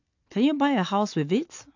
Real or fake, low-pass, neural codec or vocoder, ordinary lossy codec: real; 7.2 kHz; none; AAC, 48 kbps